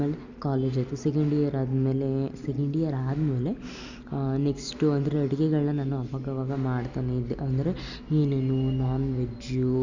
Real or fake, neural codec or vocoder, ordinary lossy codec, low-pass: real; none; Opus, 64 kbps; 7.2 kHz